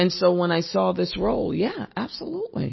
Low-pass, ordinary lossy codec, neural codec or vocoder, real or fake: 7.2 kHz; MP3, 24 kbps; none; real